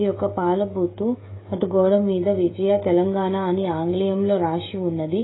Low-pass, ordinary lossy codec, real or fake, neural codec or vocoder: 7.2 kHz; AAC, 16 kbps; fake; codec, 16 kHz, 16 kbps, FreqCodec, smaller model